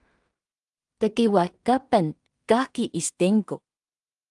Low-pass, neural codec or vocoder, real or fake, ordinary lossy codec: 10.8 kHz; codec, 16 kHz in and 24 kHz out, 0.4 kbps, LongCat-Audio-Codec, two codebook decoder; fake; Opus, 32 kbps